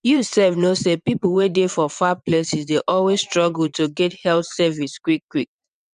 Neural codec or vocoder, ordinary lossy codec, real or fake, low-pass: vocoder, 22.05 kHz, 80 mel bands, WaveNeXt; none; fake; 9.9 kHz